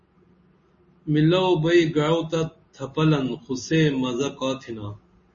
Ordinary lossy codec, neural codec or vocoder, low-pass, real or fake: MP3, 32 kbps; none; 7.2 kHz; real